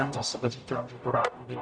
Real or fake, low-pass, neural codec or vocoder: fake; 9.9 kHz; codec, 44.1 kHz, 0.9 kbps, DAC